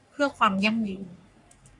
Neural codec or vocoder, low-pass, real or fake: codec, 44.1 kHz, 3.4 kbps, Pupu-Codec; 10.8 kHz; fake